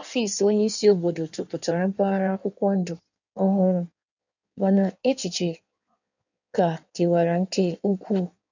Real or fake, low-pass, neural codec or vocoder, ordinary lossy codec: fake; 7.2 kHz; codec, 16 kHz in and 24 kHz out, 1.1 kbps, FireRedTTS-2 codec; none